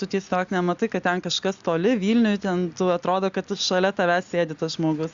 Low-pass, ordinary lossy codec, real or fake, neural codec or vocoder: 7.2 kHz; Opus, 64 kbps; real; none